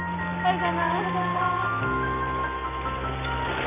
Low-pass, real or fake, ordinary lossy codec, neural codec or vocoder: 3.6 kHz; real; none; none